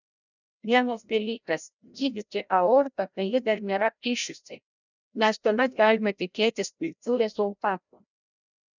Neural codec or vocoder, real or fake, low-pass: codec, 16 kHz, 0.5 kbps, FreqCodec, larger model; fake; 7.2 kHz